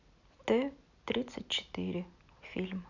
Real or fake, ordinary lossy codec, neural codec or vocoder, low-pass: real; none; none; 7.2 kHz